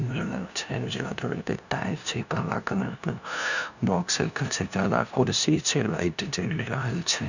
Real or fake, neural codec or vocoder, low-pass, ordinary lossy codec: fake; codec, 16 kHz, 0.5 kbps, FunCodec, trained on LibriTTS, 25 frames a second; 7.2 kHz; none